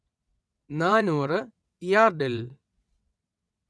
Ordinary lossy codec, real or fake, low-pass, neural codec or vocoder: none; fake; none; vocoder, 22.05 kHz, 80 mel bands, WaveNeXt